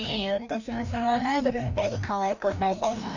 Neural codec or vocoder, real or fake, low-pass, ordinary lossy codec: codec, 16 kHz, 1 kbps, FreqCodec, larger model; fake; 7.2 kHz; none